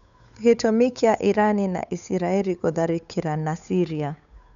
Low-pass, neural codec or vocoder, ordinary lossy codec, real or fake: 7.2 kHz; codec, 16 kHz, 8 kbps, FunCodec, trained on Chinese and English, 25 frames a second; none; fake